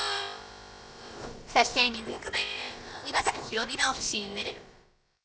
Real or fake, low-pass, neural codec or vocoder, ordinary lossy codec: fake; none; codec, 16 kHz, about 1 kbps, DyCAST, with the encoder's durations; none